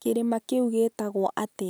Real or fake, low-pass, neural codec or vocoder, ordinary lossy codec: real; none; none; none